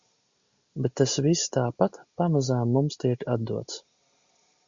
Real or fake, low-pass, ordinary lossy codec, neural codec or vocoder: real; 7.2 kHz; Opus, 64 kbps; none